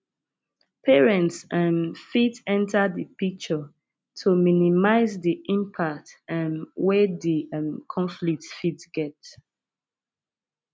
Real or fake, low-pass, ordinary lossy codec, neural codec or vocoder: real; none; none; none